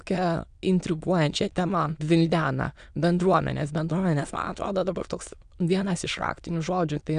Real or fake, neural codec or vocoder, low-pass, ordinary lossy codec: fake; autoencoder, 22.05 kHz, a latent of 192 numbers a frame, VITS, trained on many speakers; 9.9 kHz; AAC, 96 kbps